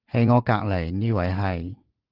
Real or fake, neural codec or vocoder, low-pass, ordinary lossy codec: fake; vocoder, 44.1 kHz, 80 mel bands, Vocos; 5.4 kHz; Opus, 16 kbps